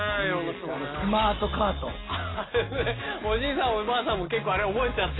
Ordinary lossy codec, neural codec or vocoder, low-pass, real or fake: AAC, 16 kbps; none; 7.2 kHz; real